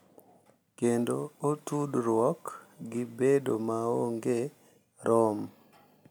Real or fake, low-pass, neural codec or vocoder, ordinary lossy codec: real; none; none; none